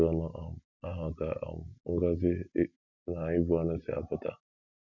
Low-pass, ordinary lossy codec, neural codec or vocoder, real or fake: 7.2 kHz; none; none; real